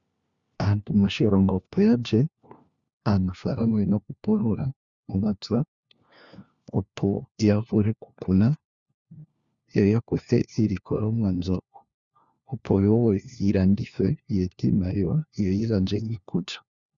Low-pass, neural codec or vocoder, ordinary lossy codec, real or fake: 7.2 kHz; codec, 16 kHz, 1 kbps, FunCodec, trained on LibriTTS, 50 frames a second; Opus, 64 kbps; fake